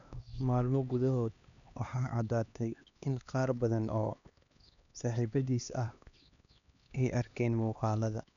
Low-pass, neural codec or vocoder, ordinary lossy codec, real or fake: 7.2 kHz; codec, 16 kHz, 2 kbps, X-Codec, HuBERT features, trained on LibriSpeech; none; fake